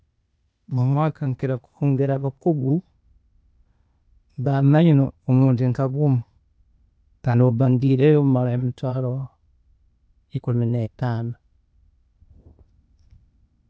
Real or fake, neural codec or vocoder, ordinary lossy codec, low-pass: fake; codec, 16 kHz, 0.8 kbps, ZipCodec; none; none